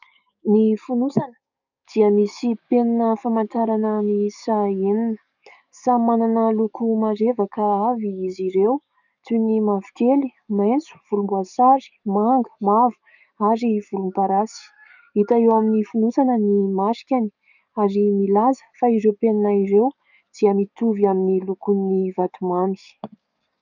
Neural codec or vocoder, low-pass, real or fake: codec, 44.1 kHz, 7.8 kbps, DAC; 7.2 kHz; fake